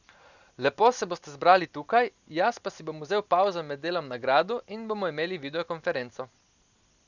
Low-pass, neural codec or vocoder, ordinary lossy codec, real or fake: 7.2 kHz; none; none; real